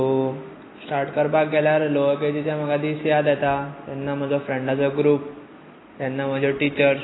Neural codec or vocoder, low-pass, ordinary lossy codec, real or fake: none; 7.2 kHz; AAC, 16 kbps; real